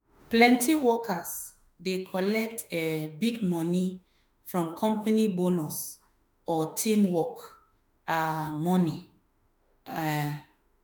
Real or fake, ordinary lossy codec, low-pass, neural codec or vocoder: fake; none; none; autoencoder, 48 kHz, 32 numbers a frame, DAC-VAE, trained on Japanese speech